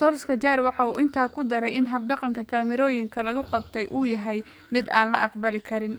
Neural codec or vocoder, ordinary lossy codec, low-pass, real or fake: codec, 44.1 kHz, 2.6 kbps, SNAC; none; none; fake